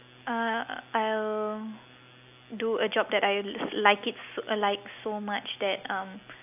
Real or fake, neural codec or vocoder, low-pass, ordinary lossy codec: real; none; 3.6 kHz; AAC, 32 kbps